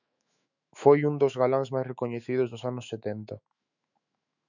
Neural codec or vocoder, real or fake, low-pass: autoencoder, 48 kHz, 128 numbers a frame, DAC-VAE, trained on Japanese speech; fake; 7.2 kHz